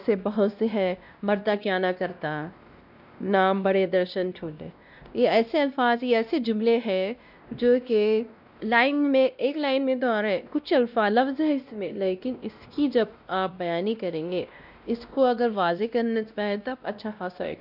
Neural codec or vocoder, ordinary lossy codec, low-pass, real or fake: codec, 16 kHz, 1 kbps, X-Codec, WavLM features, trained on Multilingual LibriSpeech; none; 5.4 kHz; fake